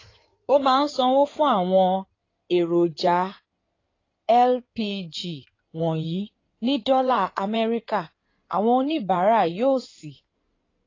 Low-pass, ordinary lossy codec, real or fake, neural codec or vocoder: 7.2 kHz; AAC, 32 kbps; fake; codec, 16 kHz in and 24 kHz out, 2.2 kbps, FireRedTTS-2 codec